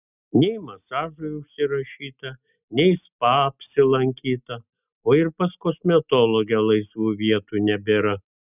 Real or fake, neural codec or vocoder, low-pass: real; none; 3.6 kHz